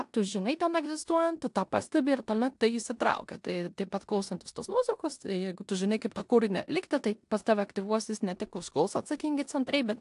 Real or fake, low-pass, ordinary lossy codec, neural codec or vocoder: fake; 10.8 kHz; AAC, 64 kbps; codec, 16 kHz in and 24 kHz out, 0.9 kbps, LongCat-Audio-Codec, four codebook decoder